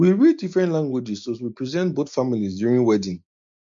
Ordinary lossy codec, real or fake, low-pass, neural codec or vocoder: MP3, 48 kbps; real; 7.2 kHz; none